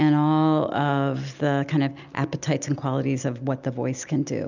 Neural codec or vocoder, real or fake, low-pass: none; real; 7.2 kHz